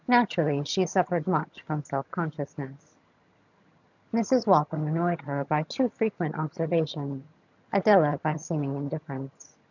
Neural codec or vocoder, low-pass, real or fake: vocoder, 22.05 kHz, 80 mel bands, HiFi-GAN; 7.2 kHz; fake